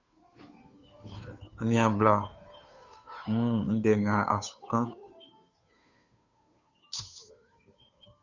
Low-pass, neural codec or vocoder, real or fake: 7.2 kHz; codec, 16 kHz, 2 kbps, FunCodec, trained on Chinese and English, 25 frames a second; fake